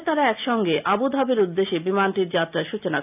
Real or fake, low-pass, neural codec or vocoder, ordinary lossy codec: real; 3.6 kHz; none; AAC, 32 kbps